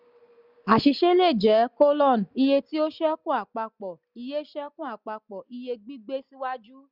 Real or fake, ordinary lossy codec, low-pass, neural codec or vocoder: real; none; 5.4 kHz; none